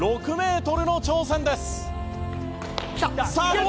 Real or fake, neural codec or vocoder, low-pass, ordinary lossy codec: real; none; none; none